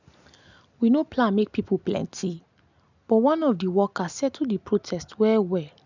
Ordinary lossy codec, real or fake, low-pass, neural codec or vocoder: none; real; 7.2 kHz; none